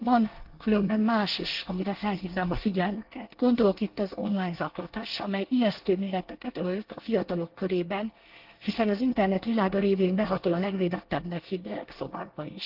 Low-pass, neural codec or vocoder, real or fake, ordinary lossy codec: 5.4 kHz; codec, 24 kHz, 1 kbps, SNAC; fake; Opus, 16 kbps